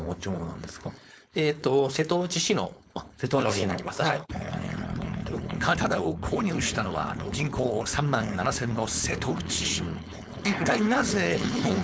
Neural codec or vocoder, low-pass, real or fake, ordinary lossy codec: codec, 16 kHz, 4.8 kbps, FACodec; none; fake; none